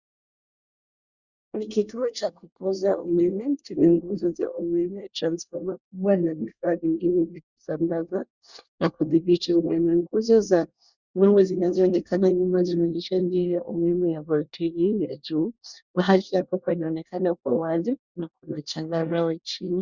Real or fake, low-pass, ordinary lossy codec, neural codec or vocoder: fake; 7.2 kHz; Opus, 64 kbps; codec, 24 kHz, 1 kbps, SNAC